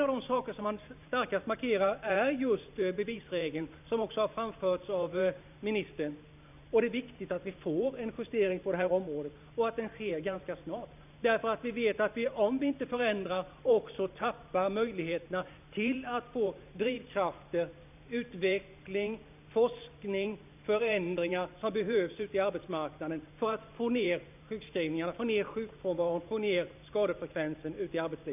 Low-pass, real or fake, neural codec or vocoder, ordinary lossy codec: 3.6 kHz; fake; vocoder, 44.1 kHz, 128 mel bands every 512 samples, BigVGAN v2; none